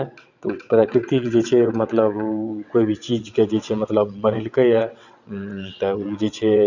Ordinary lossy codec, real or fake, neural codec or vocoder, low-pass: none; fake; vocoder, 22.05 kHz, 80 mel bands, WaveNeXt; 7.2 kHz